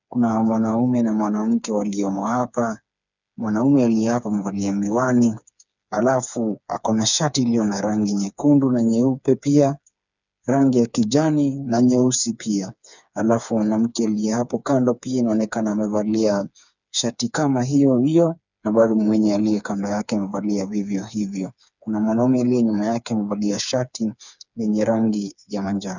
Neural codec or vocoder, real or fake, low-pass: codec, 16 kHz, 4 kbps, FreqCodec, smaller model; fake; 7.2 kHz